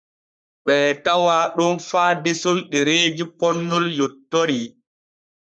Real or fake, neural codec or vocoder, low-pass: fake; codec, 44.1 kHz, 3.4 kbps, Pupu-Codec; 9.9 kHz